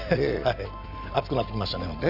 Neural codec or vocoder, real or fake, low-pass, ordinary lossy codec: none; real; 5.4 kHz; none